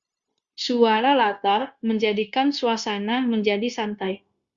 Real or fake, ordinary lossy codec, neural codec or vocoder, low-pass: fake; Opus, 64 kbps; codec, 16 kHz, 0.9 kbps, LongCat-Audio-Codec; 7.2 kHz